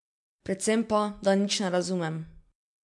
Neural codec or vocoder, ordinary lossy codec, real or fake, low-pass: none; MP3, 64 kbps; real; 10.8 kHz